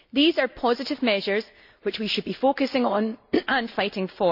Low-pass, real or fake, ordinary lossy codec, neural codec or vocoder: 5.4 kHz; real; none; none